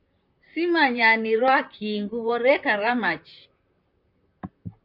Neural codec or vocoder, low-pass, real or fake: vocoder, 44.1 kHz, 128 mel bands, Pupu-Vocoder; 5.4 kHz; fake